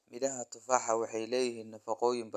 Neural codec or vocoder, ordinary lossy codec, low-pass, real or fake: none; none; 14.4 kHz; real